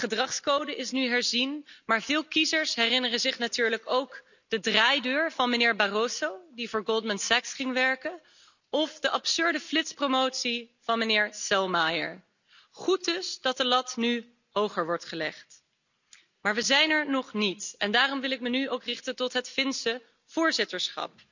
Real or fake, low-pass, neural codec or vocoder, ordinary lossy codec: real; 7.2 kHz; none; none